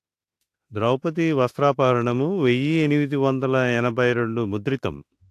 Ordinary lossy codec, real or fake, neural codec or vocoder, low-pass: AAC, 64 kbps; fake; autoencoder, 48 kHz, 32 numbers a frame, DAC-VAE, trained on Japanese speech; 14.4 kHz